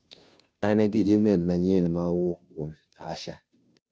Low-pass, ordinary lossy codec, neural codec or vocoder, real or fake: none; none; codec, 16 kHz, 0.5 kbps, FunCodec, trained on Chinese and English, 25 frames a second; fake